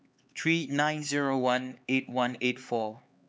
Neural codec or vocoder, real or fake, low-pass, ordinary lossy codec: codec, 16 kHz, 4 kbps, X-Codec, HuBERT features, trained on LibriSpeech; fake; none; none